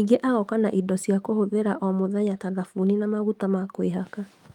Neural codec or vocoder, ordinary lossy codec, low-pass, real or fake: autoencoder, 48 kHz, 128 numbers a frame, DAC-VAE, trained on Japanese speech; Opus, 32 kbps; 19.8 kHz; fake